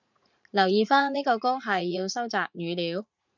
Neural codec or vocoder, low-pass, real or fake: vocoder, 44.1 kHz, 80 mel bands, Vocos; 7.2 kHz; fake